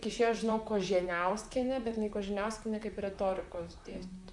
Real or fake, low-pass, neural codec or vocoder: fake; 10.8 kHz; vocoder, 44.1 kHz, 128 mel bands, Pupu-Vocoder